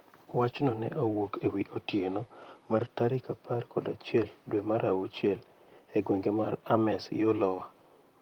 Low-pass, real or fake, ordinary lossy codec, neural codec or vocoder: 19.8 kHz; fake; Opus, 32 kbps; vocoder, 44.1 kHz, 128 mel bands, Pupu-Vocoder